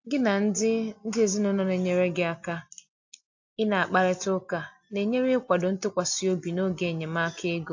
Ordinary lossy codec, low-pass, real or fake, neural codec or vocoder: AAC, 48 kbps; 7.2 kHz; real; none